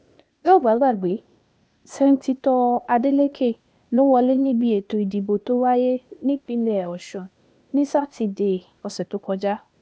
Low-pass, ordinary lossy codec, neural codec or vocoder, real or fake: none; none; codec, 16 kHz, 0.8 kbps, ZipCodec; fake